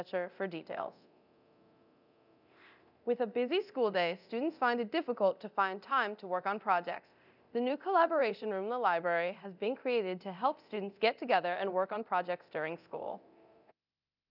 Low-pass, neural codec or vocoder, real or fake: 5.4 kHz; codec, 24 kHz, 0.9 kbps, DualCodec; fake